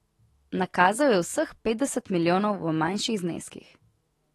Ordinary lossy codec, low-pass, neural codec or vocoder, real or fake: AAC, 32 kbps; 19.8 kHz; autoencoder, 48 kHz, 128 numbers a frame, DAC-VAE, trained on Japanese speech; fake